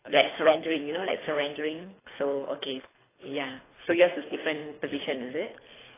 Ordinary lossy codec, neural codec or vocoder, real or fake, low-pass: AAC, 16 kbps; codec, 24 kHz, 3 kbps, HILCodec; fake; 3.6 kHz